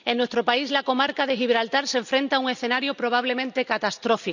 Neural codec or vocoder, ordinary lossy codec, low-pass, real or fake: none; none; 7.2 kHz; real